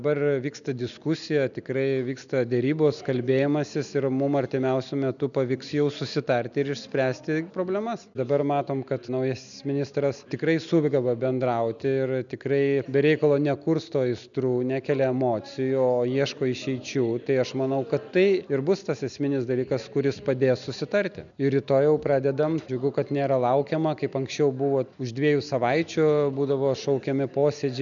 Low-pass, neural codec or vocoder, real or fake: 7.2 kHz; none; real